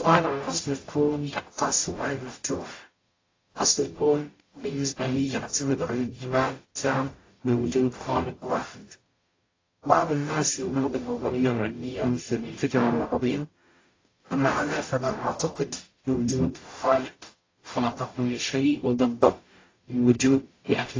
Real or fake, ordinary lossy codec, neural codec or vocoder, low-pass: fake; AAC, 32 kbps; codec, 44.1 kHz, 0.9 kbps, DAC; 7.2 kHz